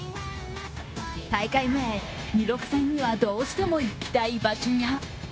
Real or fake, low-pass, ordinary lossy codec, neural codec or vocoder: fake; none; none; codec, 16 kHz, 0.9 kbps, LongCat-Audio-Codec